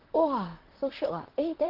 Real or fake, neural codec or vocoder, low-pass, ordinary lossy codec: fake; vocoder, 44.1 kHz, 128 mel bands, Pupu-Vocoder; 5.4 kHz; Opus, 16 kbps